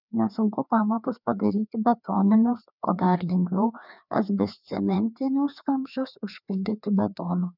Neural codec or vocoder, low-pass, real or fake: codec, 16 kHz, 2 kbps, FreqCodec, larger model; 5.4 kHz; fake